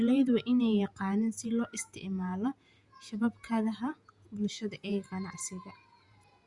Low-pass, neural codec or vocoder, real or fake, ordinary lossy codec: 10.8 kHz; vocoder, 48 kHz, 128 mel bands, Vocos; fake; Opus, 64 kbps